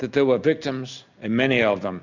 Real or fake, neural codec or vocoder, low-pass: real; none; 7.2 kHz